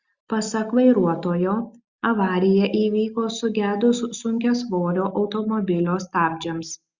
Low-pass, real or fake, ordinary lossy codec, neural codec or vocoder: 7.2 kHz; real; Opus, 64 kbps; none